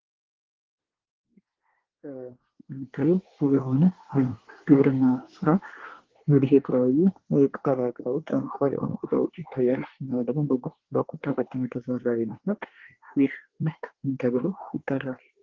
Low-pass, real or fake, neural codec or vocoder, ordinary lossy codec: 7.2 kHz; fake; codec, 24 kHz, 1 kbps, SNAC; Opus, 16 kbps